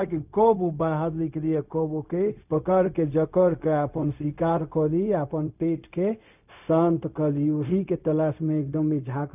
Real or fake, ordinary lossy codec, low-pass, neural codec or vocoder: fake; none; 3.6 kHz; codec, 16 kHz, 0.4 kbps, LongCat-Audio-Codec